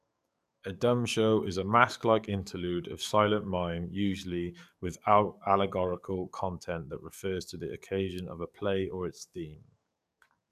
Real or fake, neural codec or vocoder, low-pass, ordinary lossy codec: fake; codec, 44.1 kHz, 7.8 kbps, DAC; 14.4 kHz; none